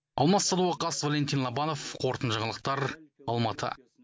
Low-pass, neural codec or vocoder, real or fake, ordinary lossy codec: none; none; real; none